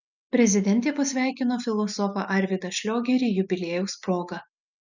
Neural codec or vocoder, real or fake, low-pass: none; real; 7.2 kHz